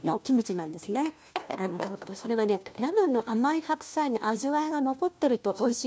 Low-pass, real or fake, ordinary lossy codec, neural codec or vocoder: none; fake; none; codec, 16 kHz, 1 kbps, FunCodec, trained on LibriTTS, 50 frames a second